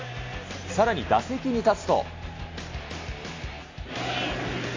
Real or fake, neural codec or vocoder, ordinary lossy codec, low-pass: real; none; AAC, 32 kbps; 7.2 kHz